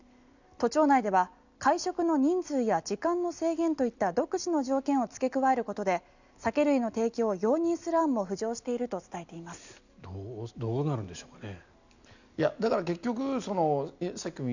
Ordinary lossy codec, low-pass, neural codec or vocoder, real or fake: none; 7.2 kHz; none; real